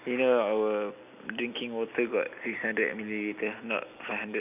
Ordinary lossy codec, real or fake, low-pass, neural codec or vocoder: none; real; 3.6 kHz; none